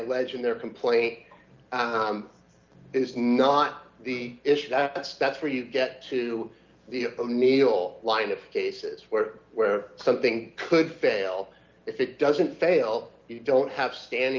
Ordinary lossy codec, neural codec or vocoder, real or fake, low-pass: Opus, 24 kbps; none; real; 7.2 kHz